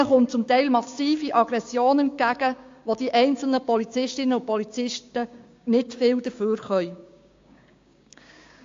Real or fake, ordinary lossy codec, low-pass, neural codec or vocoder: fake; AAC, 48 kbps; 7.2 kHz; codec, 16 kHz, 6 kbps, DAC